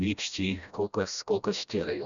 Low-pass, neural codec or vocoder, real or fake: 7.2 kHz; codec, 16 kHz, 1 kbps, FreqCodec, smaller model; fake